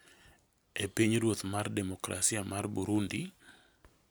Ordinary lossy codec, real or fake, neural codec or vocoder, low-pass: none; real; none; none